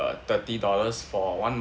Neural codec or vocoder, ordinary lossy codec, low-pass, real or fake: none; none; none; real